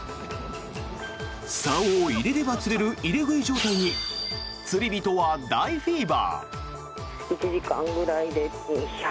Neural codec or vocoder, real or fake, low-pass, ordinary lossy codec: none; real; none; none